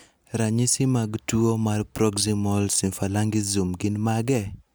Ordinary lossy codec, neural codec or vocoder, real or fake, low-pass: none; none; real; none